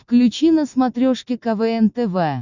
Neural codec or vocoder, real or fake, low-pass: none; real; 7.2 kHz